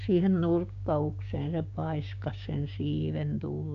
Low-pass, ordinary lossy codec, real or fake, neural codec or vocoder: 7.2 kHz; none; fake; codec, 16 kHz, 6 kbps, DAC